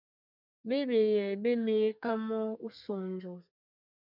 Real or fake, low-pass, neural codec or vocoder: fake; 5.4 kHz; codec, 32 kHz, 1.9 kbps, SNAC